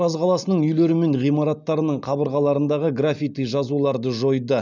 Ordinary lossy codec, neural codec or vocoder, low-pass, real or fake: none; none; 7.2 kHz; real